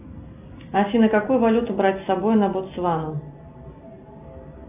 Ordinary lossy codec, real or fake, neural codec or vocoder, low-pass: AAC, 32 kbps; real; none; 3.6 kHz